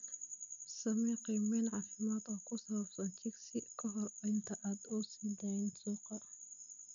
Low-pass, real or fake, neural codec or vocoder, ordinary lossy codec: 7.2 kHz; real; none; none